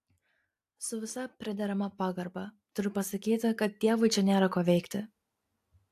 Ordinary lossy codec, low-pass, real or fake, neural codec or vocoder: AAC, 64 kbps; 14.4 kHz; real; none